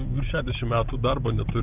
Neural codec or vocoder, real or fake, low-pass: none; real; 3.6 kHz